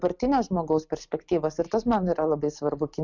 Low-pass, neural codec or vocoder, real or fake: 7.2 kHz; none; real